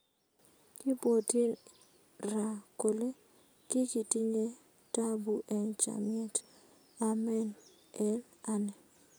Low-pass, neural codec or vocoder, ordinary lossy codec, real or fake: none; none; none; real